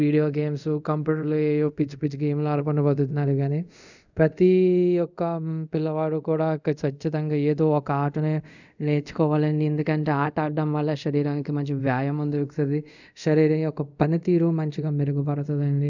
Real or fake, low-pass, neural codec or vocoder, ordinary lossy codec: fake; 7.2 kHz; codec, 24 kHz, 0.5 kbps, DualCodec; none